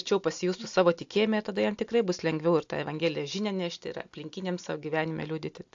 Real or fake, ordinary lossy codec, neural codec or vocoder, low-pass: real; AAC, 64 kbps; none; 7.2 kHz